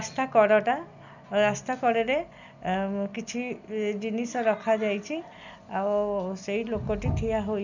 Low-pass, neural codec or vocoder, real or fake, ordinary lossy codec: 7.2 kHz; none; real; none